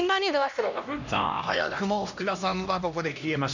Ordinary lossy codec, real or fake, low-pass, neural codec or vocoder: none; fake; 7.2 kHz; codec, 16 kHz, 1 kbps, X-Codec, HuBERT features, trained on LibriSpeech